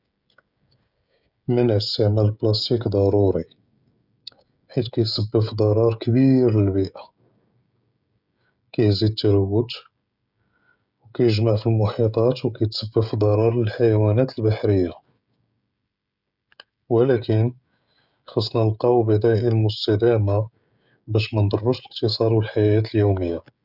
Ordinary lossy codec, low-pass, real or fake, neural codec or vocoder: none; 5.4 kHz; fake; codec, 16 kHz, 16 kbps, FreqCodec, smaller model